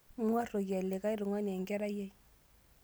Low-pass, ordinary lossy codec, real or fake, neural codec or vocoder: none; none; real; none